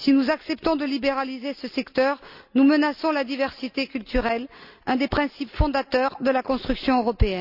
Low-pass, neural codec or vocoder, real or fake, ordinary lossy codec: 5.4 kHz; none; real; none